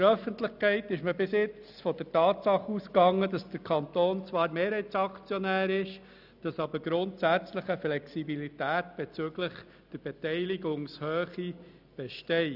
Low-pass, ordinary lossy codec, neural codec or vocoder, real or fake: 5.4 kHz; none; none; real